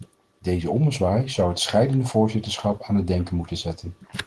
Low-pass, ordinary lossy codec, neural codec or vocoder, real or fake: 10.8 kHz; Opus, 16 kbps; none; real